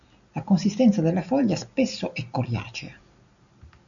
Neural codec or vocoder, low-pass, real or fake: none; 7.2 kHz; real